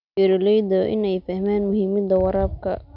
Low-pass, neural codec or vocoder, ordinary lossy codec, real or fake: 5.4 kHz; none; none; real